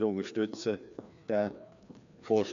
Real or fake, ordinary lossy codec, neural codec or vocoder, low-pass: fake; AAC, 96 kbps; codec, 16 kHz, 2 kbps, FreqCodec, larger model; 7.2 kHz